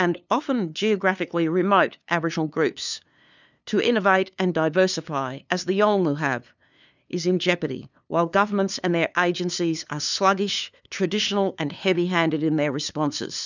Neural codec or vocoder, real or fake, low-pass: codec, 16 kHz, 2 kbps, FunCodec, trained on LibriTTS, 25 frames a second; fake; 7.2 kHz